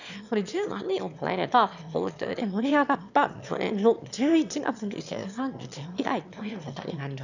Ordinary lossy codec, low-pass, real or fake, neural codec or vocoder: none; 7.2 kHz; fake; autoencoder, 22.05 kHz, a latent of 192 numbers a frame, VITS, trained on one speaker